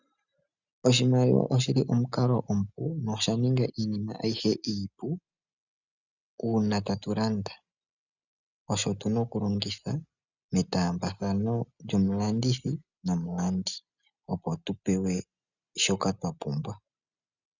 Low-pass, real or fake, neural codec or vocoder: 7.2 kHz; real; none